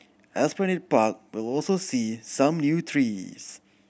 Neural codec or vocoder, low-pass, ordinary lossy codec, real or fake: none; none; none; real